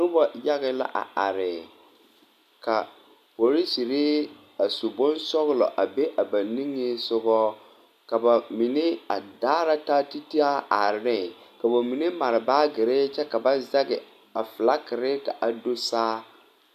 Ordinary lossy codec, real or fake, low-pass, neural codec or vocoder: AAC, 96 kbps; real; 14.4 kHz; none